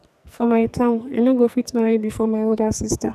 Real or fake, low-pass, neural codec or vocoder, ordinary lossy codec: fake; 14.4 kHz; codec, 44.1 kHz, 2.6 kbps, SNAC; none